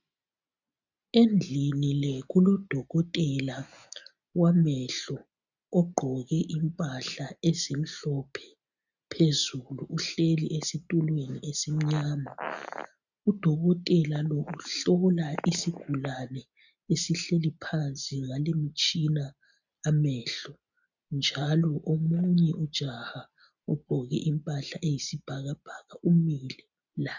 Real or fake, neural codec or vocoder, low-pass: real; none; 7.2 kHz